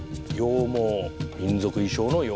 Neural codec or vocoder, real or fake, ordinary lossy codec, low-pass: none; real; none; none